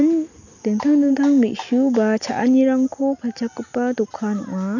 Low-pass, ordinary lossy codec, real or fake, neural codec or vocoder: 7.2 kHz; none; real; none